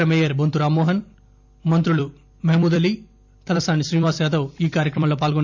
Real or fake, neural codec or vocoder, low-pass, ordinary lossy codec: fake; vocoder, 44.1 kHz, 128 mel bands every 256 samples, BigVGAN v2; 7.2 kHz; MP3, 64 kbps